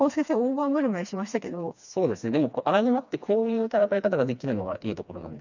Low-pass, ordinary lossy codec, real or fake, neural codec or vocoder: 7.2 kHz; none; fake; codec, 16 kHz, 2 kbps, FreqCodec, smaller model